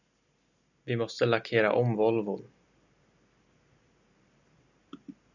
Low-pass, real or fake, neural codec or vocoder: 7.2 kHz; real; none